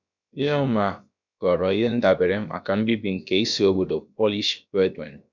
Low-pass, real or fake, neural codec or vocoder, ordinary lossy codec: 7.2 kHz; fake; codec, 16 kHz, about 1 kbps, DyCAST, with the encoder's durations; none